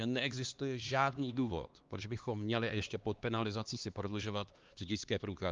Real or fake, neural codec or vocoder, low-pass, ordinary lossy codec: fake; codec, 16 kHz, 2 kbps, X-Codec, HuBERT features, trained on LibriSpeech; 7.2 kHz; Opus, 24 kbps